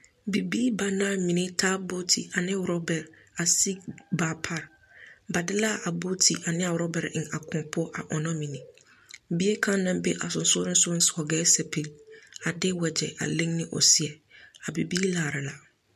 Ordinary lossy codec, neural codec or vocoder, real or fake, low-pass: MP3, 64 kbps; none; real; 14.4 kHz